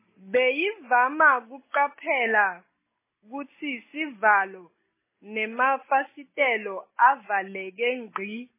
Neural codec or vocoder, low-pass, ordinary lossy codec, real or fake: none; 3.6 kHz; MP3, 16 kbps; real